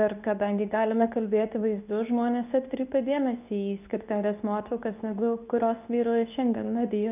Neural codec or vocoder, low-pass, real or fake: codec, 24 kHz, 0.9 kbps, WavTokenizer, medium speech release version 2; 3.6 kHz; fake